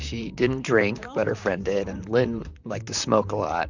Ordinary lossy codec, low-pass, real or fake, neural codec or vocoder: Opus, 64 kbps; 7.2 kHz; fake; vocoder, 44.1 kHz, 128 mel bands, Pupu-Vocoder